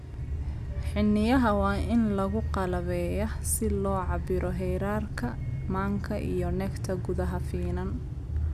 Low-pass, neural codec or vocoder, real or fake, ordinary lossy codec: 14.4 kHz; none; real; none